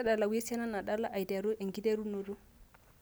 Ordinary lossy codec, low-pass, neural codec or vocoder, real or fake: none; none; none; real